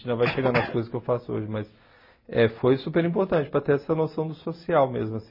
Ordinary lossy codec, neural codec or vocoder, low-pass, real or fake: MP3, 24 kbps; none; 5.4 kHz; real